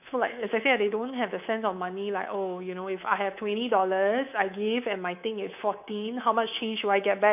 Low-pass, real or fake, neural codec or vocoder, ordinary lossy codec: 3.6 kHz; fake; codec, 24 kHz, 3.1 kbps, DualCodec; none